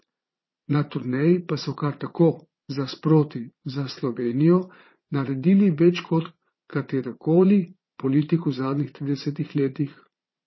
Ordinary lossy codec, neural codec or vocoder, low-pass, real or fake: MP3, 24 kbps; vocoder, 22.05 kHz, 80 mel bands, Vocos; 7.2 kHz; fake